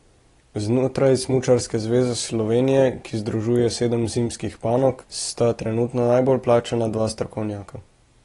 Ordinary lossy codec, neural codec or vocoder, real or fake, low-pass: AAC, 32 kbps; none; real; 10.8 kHz